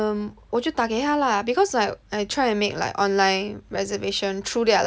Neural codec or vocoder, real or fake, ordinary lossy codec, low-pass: none; real; none; none